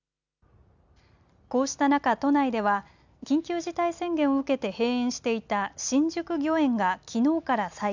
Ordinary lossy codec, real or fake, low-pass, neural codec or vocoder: none; real; 7.2 kHz; none